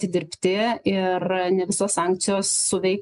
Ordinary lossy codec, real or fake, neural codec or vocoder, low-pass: MP3, 96 kbps; real; none; 10.8 kHz